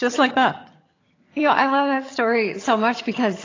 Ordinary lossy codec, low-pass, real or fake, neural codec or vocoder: AAC, 32 kbps; 7.2 kHz; fake; vocoder, 22.05 kHz, 80 mel bands, HiFi-GAN